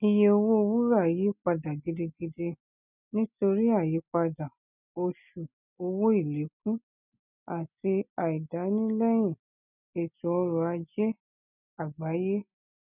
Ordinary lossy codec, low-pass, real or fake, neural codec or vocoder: none; 3.6 kHz; real; none